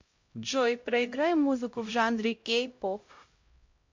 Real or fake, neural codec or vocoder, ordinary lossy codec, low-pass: fake; codec, 16 kHz, 0.5 kbps, X-Codec, HuBERT features, trained on LibriSpeech; MP3, 48 kbps; 7.2 kHz